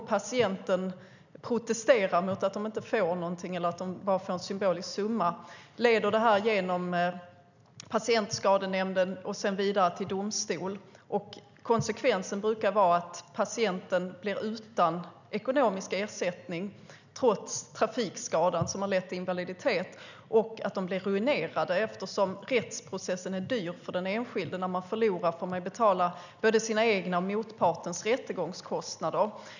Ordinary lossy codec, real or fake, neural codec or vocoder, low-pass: none; real; none; 7.2 kHz